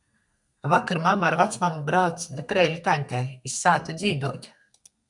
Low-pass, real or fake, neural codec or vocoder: 10.8 kHz; fake; codec, 32 kHz, 1.9 kbps, SNAC